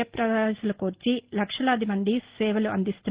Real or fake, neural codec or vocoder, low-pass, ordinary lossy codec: real; none; 3.6 kHz; Opus, 16 kbps